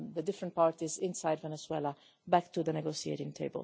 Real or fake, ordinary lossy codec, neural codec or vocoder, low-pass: real; none; none; none